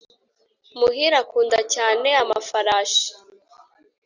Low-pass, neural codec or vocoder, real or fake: 7.2 kHz; none; real